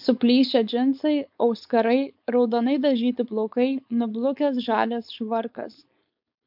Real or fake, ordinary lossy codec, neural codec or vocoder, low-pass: fake; MP3, 48 kbps; codec, 16 kHz, 4.8 kbps, FACodec; 5.4 kHz